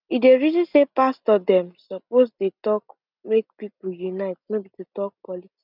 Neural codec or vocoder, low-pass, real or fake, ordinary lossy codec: none; 5.4 kHz; real; none